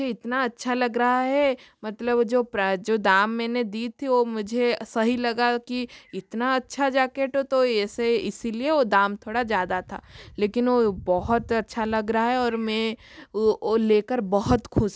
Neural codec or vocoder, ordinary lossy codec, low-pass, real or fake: none; none; none; real